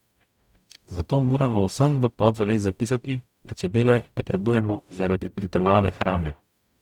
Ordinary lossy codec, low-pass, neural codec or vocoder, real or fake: none; 19.8 kHz; codec, 44.1 kHz, 0.9 kbps, DAC; fake